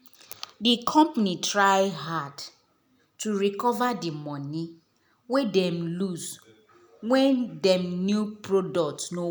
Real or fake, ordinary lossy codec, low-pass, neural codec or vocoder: real; none; none; none